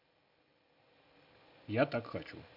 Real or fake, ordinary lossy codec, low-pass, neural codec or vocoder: real; MP3, 48 kbps; 5.4 kHz; none